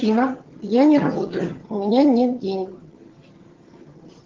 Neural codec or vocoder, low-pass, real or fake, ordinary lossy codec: vocoder, 22.05 kHz, 80 mel bands, HiFi-GAN; 7.2 kHz; fake; Opus, 16 kbps